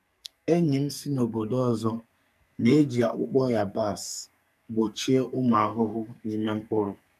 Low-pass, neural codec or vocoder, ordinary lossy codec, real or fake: 14.4 kHz; codec, 44.1 kHz, 2.6 kbps, SNAC; none; fake